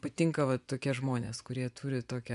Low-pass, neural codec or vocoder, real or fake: 10.8 kHz; vocoder, 24 kHz, 100 mel bands, Vocos; fake